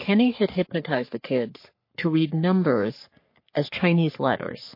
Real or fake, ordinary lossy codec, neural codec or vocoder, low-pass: fake; MP3, 32 kbps; codec, 44.1 kHz, 3.4 kbps, Pupu-Codec; 5.4 kHz